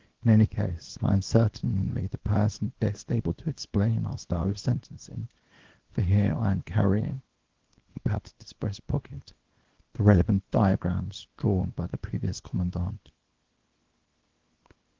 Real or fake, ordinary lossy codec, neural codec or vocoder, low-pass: fake; Opus, 16 kbps; codec, 24 kHz, 0.9 kbps, WavTokenizer, small release; 7.2 kHz